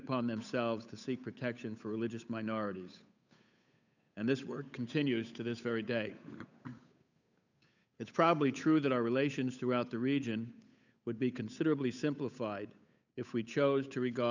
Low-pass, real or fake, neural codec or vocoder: 7.2 kHz; fake; codec, 16 kHz, 8 kbps, FunCodec, trained on Chinese and English, 25 frames a second